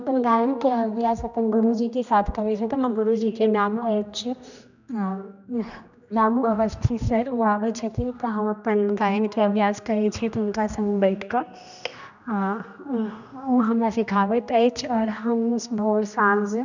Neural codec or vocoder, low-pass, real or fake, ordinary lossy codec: codec, 16 kHz, 1 kbps, X-Codec, HuBERT features, trained on general audio; 7.2 kHz; fake; none